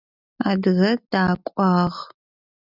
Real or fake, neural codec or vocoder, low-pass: real; none; 5.4 kHz